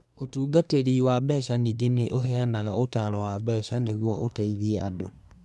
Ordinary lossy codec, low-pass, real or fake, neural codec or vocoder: none; none; fake; codec, 24 kHz, 1 kbps, SNAC